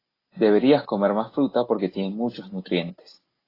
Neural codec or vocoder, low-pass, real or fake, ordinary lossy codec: none; 5.4 kHz; real; AAC, 24 kbps